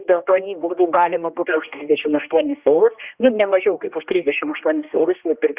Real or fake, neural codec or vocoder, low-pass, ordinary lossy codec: fake; codec, 16 kHz, 1 kbps, X-Codec, HuBERT features, trained on general audio; 3.6 kHz; Opus, 64 kbps